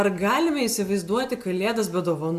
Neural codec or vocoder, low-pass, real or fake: none; 14.4 kHz; real